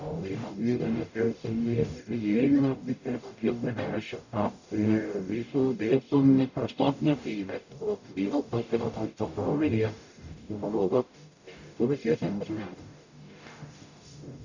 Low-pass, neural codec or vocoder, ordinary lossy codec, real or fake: 7.2 kHz; codec, 44.1 kHz, 0.9 kbps, DAC; none; fake